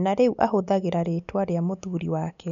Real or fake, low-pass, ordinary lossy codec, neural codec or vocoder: real; 7.2 kHz; none; none